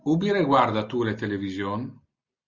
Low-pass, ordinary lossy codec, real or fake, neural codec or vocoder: 7.2 kHz; Opus, 64 kbps; real; none